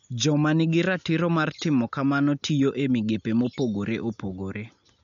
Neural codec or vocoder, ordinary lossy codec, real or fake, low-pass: none; none; real; 7.2 kHz